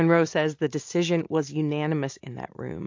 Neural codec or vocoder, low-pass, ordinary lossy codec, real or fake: none; 7.2 kHz; MP3, 48 kbps; real